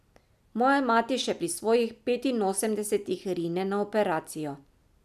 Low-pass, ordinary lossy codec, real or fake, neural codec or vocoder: 14.4 kHz; none; real; none